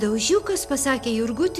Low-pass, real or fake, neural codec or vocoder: 14.4 kHz; real; none